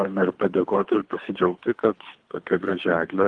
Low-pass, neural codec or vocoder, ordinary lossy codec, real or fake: 9.9 kHz; codec, 24 kHz, 3 kbps, HILCodec; Opus, 24 kbps; fake